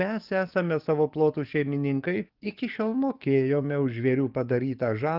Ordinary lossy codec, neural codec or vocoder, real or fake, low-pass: Opus, 32 kbps; codec, 44.1 kHz, 7.8 kbps, DAC; fake; 5.4 kHz